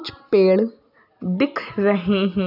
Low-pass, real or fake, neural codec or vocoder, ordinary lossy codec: 5.4 kHz; real; none; none